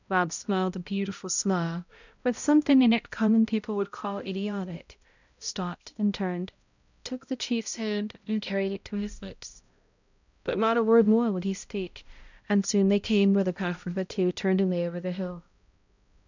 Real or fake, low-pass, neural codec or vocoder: fake; 7.2 kHz; codec, 16 kHz, 0.5 kbps, X-Codec, HuBERT features, trained on balanced general audio